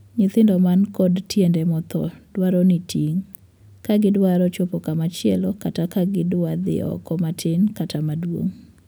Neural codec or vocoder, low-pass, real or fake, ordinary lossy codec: none; none; real; none